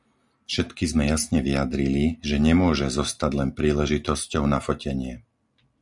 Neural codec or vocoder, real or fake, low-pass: none; real; 10.8 kHz